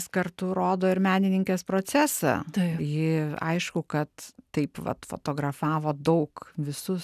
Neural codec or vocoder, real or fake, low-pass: none; real; 14.4 kHz